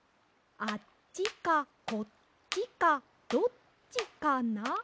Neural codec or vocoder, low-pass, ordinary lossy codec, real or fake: none; none; none; real